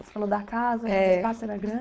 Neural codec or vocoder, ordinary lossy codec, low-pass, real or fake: codec, 16 kHz, 4.8 kbps, FACodec; none; none; fake